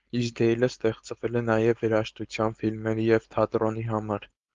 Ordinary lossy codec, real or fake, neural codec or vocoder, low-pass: Opus, 32 kbps; fake; codec, 16 kHz, 4.8 kbps, FACodec; 7.2 kHz